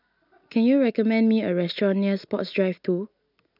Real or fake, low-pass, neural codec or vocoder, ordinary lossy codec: real; 5.4 kHz; none; none